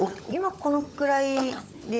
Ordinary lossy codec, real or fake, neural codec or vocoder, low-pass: none; fake; codec, 16 kHz, 16 kbps, FunCodec, trained on LibriTTS, 50 frames a second; none